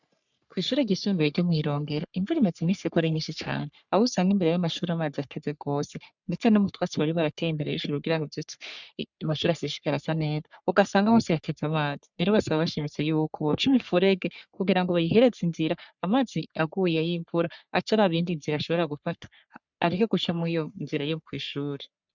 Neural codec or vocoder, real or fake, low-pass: codec, 44.1 kHz, 3.4 kbps, Pupu-Codec; fake; 7.2 kHz